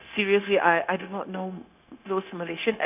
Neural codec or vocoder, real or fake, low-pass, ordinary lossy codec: codec, 16 kHz, 0.9 kbps, LongCat-Audio-Codec; fake; 3.6 kHz; none